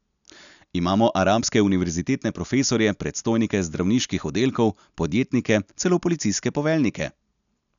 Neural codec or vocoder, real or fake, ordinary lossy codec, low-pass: none; real; none; 7.2 kHz